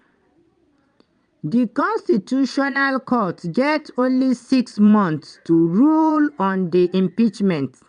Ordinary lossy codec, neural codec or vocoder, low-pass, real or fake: none; vocoder, 22.05 kHz, 80 mel bands, Vocos; 9.9 kHz; fake